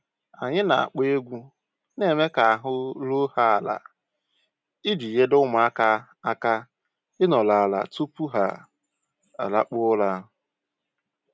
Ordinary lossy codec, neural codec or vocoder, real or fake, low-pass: none; none; real; none